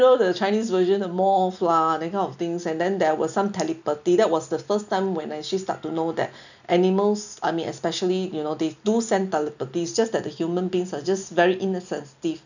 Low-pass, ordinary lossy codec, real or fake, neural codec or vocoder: 7.2 kHz; none; real; none